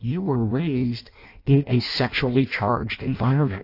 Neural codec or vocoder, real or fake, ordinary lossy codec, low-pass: codec, 16 kHz in and 24 kHz out, 0.6 kbps, FireRedTTS-2 codec; fake; AAC, 32 kbps; 5.4 kHz